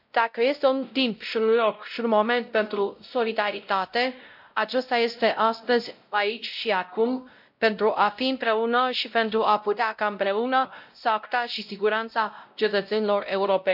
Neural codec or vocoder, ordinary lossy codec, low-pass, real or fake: codec, 16 kHz, 0.5 kbps, X-Codec, WavLM features, trained on Multilingual LibriSpeech; MP3, 48 kbps; 5.4 kHz; fake